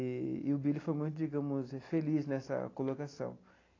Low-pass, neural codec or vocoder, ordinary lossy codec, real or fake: 7.2 kHz; none; AAC, 32 kbps; real